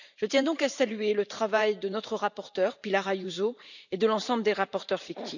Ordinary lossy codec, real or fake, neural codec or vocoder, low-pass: none; fake; vocoder, 44.1 kHz, 128 mel bands every 512 samples, BigVGAN v2; 7.2 kHz